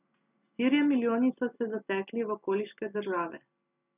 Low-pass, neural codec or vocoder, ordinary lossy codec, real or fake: 3.6 kHz; none; none; real